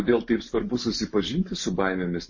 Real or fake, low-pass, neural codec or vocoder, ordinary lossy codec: real; 7.2 kHz; none; MP3, 32 kbps